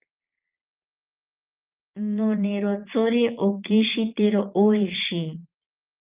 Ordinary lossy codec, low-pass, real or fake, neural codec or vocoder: Opus, 24 kbps; 3.6 kHz; fake; vocoder, 22.05 kHz, 80 mel bands, Vocos